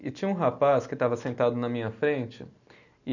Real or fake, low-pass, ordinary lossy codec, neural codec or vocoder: real; 7.2 kHz; none; none